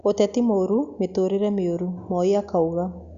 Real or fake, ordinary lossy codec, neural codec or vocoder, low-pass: real; Opus, 64 kbps; none; 7.2 kHz